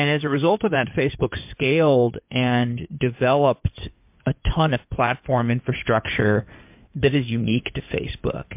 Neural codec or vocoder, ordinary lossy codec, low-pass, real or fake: codec, 16 kHz in and 24 kHz out, 2.2 kbps, FireRedTTS-2 codec; MP3, 32 kbps; 3.6 kHz; fake